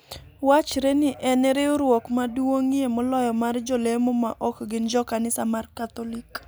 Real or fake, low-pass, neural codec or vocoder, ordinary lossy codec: real; none; none; none